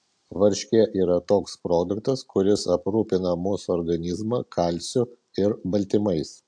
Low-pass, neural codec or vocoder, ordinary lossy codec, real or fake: 9.9 kHz; none; AAC, 64 kbps; real